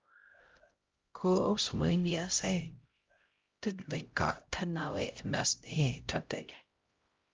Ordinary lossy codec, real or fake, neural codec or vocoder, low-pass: Opus, 32 kbps; fake; codec, 16 kHz, 0.5 kbps, X-Codec, HuBERT features, trained on LibriSpeech; 7.2 kHz